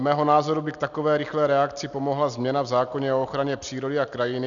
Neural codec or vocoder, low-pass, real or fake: none; 7.2 kHz; real